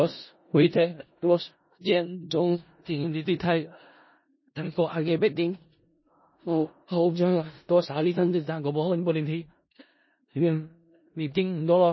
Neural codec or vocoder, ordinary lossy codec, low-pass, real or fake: codec, 16 kHz in and 24 kHz out, 0.4 kbps, LongCat-Audio-Codec, four codebook decoder; MP3, 24 kbps; 7.2 kHz; fake